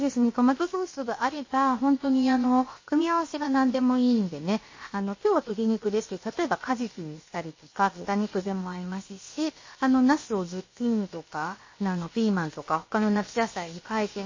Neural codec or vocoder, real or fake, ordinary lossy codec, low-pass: codec, 16 kHz, about 1 kbps, DyCAST, with the encoder's durations; fake; MP3, 32 kbps; 7.2 kHz